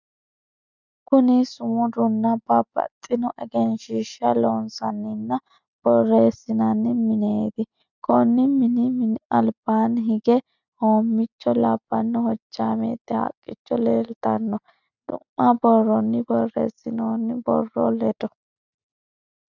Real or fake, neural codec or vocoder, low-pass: real; none; 7.2 kHz